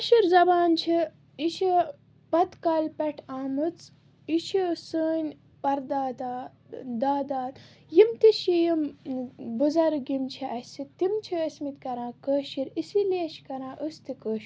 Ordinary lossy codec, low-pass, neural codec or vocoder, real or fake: none; none; none; real